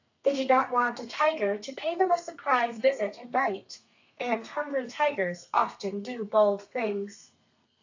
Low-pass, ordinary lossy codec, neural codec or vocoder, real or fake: 7.2 kHz; AAC, 48 kbps; codec, 32 kHz, 1.9 kbps, SNAC; fake